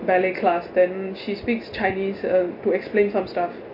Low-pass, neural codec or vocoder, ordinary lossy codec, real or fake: 5.4 kHz; none; MP3, 32 kbps; real